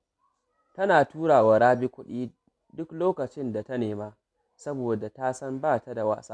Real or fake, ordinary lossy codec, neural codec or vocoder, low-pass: real; none; none; none